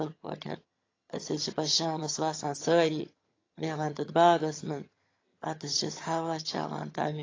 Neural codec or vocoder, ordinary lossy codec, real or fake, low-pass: vocoder, 22.05 kHz, 80 mel bands, HiFi-GAN; AAC, 32 kbps; fake; 7.2 kHz